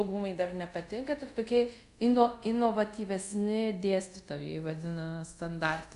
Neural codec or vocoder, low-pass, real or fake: codec, 24 kHz, 0.5 kbps, DualCodec; 10.8 kHz; fake